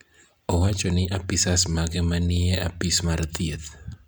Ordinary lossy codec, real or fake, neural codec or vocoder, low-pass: none; real; none; none